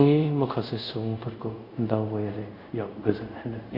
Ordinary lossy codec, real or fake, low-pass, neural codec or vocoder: Opus, 64 kbps; fake; 5.4 kHz; codec, 24 kHz, 0.5 kbps, DualCodec